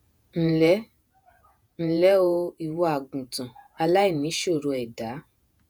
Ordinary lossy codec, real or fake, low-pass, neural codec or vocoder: none; fake; none; vocoder, 48 kHz, 128 mel bands, Vocos